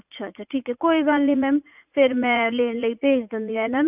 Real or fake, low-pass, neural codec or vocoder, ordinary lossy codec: fake; 3.6 kHz; vocoder, 44.1 kHz, 80 mel bands, Vocos; none